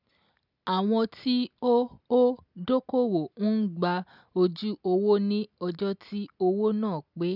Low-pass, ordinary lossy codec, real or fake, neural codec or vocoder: 5.4 kHz; none; real; none